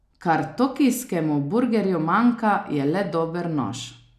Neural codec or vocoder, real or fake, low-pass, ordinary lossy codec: none; real; 14.4 kHz; none